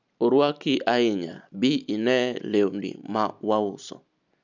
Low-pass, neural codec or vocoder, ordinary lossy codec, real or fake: 7.2 kHz; none; none; real